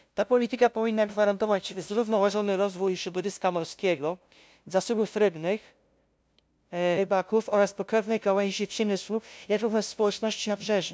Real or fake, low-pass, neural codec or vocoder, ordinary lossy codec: fake; none; codec, 16 kHz, 0.5 kbps, FunCodec, trained on LibriTTS, 25 frames a second; none